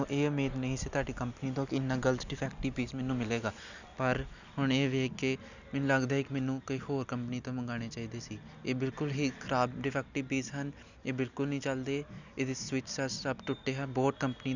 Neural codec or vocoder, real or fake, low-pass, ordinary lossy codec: none; real; 7.2 kHz; none